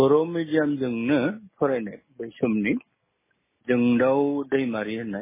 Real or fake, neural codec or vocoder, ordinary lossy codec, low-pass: real; none; MP3, 16 kbps; 3.6 kHz